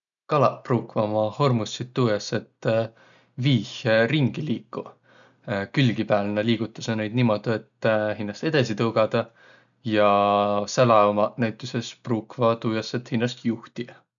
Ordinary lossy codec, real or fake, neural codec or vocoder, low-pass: none; real; none; 7.2 kHz